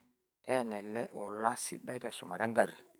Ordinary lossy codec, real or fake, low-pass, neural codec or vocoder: none; fake; none; codec, 44.1 kHz, 2.6 kbps, SNAC